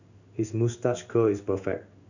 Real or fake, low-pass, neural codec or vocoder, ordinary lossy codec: fake; 7.2 kHz; codec, 16 kHz in and 24 kHz out, 1 kbps, XY-Tokenizer; none